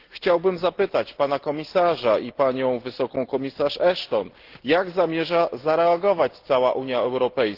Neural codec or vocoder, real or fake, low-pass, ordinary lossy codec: none; real; 5.4 kHz; Opus, 16 kbps